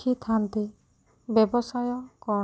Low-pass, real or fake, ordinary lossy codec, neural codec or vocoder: none; real; none; none